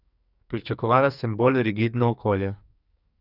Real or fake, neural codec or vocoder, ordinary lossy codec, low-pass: fake; codec, 44.1 kHz, 2.6 kbps, SNAC; none; 5.4 kHz